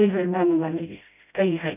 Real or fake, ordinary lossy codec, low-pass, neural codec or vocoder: fake; none; 3.6 kHz; codec, 16 kHz, 0.5 kbps, FreqCodec, smaller model